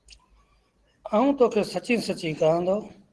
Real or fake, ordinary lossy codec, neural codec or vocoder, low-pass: real; Opus, 16 kbps; none; 10.8 kHz